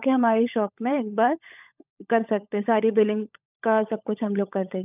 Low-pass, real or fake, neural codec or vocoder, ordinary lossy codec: 3.6 kHz; fake; codec, 16 kHz, 16 kbps, FunCodec, trained on LibriTTS, 50 frames a second; none